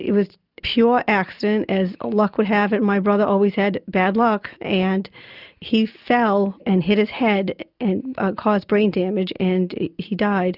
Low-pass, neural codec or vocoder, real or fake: 5.4 kHz; none; real